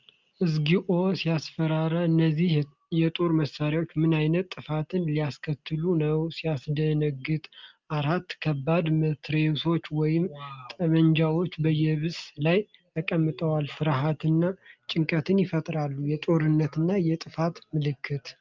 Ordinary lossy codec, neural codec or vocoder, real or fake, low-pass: Opus, 24 kbps; none; real; 7.2 kHz